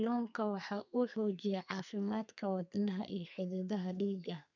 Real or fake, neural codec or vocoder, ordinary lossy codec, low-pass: fake; codec, 32 kHz, 1.9 kbps, SNAC; none; 7.2 kHz